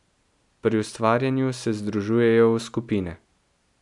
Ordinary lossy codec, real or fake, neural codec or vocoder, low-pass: none; real; none; 10.8 kHz